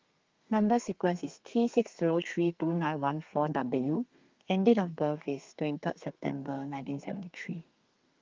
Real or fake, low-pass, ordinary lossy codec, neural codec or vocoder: fake; 7.2 kHz; Opus, 32 kbps; codec, 32 kHz, 1.9 kbps, SNAC